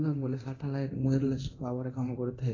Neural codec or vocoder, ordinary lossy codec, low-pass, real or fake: codec, 24 kHz, 0.9 kbps, DualCodec; AAC, 32 kbps; 7.2 kHz; fake